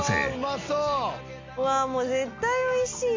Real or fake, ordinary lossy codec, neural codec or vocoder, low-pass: real; MP3, 48 kbps; none; 7.2 kHz